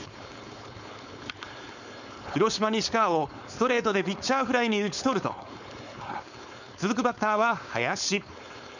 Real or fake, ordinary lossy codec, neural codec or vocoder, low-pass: fake; none; codec, 16 kHz, 4.8 kbps, FACodec; 7.2 kHz